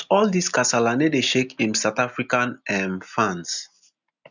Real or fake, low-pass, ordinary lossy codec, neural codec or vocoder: real; 7.2 kHz; none; none